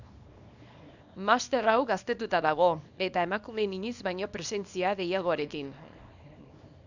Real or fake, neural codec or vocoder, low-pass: fake; codec, 24 kHz, 0.9 kbps, WavTokenizer, small release; 7.2 kHz